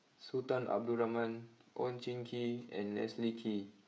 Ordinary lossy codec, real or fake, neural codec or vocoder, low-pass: none; fake; codec, 16 kHz, 16 kbps, FreqCodec, smaller model; none